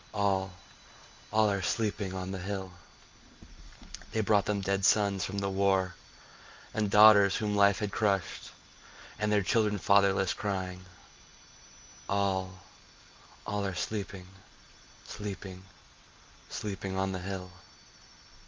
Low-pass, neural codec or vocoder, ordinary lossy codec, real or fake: 7.2 kHz; none; Opus, 32 kbps; real